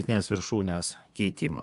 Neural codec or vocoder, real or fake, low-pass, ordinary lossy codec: codec, 24 kHz, 1 kbps, SNAC; fake; 10.8 kHz; AAC, 96 kbps